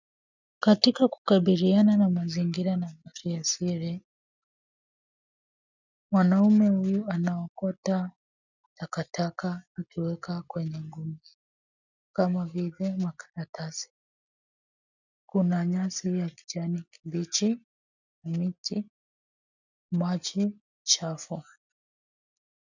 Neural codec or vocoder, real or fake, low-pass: none; real; 7.2 kHz